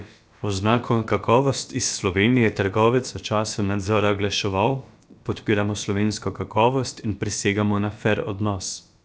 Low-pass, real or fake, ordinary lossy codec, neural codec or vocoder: none; fake; none; codec, 16 kHz, about 1 kbps, DyCAST, with the encoder's durations